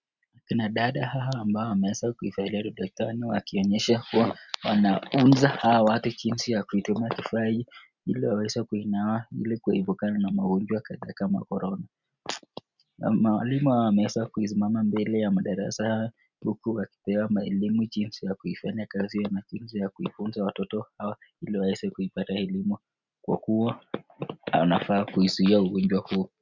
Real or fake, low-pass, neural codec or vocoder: real; 7.2 kHz; none